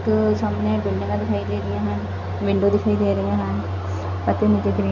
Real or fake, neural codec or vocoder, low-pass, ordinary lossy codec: real; none; 7.2 kHz; none